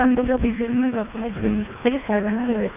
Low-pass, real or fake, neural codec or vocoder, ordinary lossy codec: 3.6 kHz; fake; codec, 24 kHz, 1.5 kbps, HILCodec; none